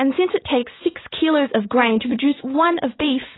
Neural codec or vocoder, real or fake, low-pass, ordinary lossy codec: vocoder, 44.1 kHz, 80 mel bands, Vocos; fake; 7.2 kHz; AAC, 16 kbps